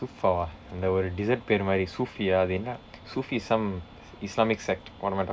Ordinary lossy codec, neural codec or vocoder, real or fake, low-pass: none; none; real; none